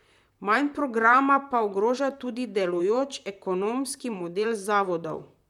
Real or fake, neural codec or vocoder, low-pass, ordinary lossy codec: fake; vocoder, 44.1 kHz, 128 mel bands, Pupu-Vocoder; 19.8 kHz; none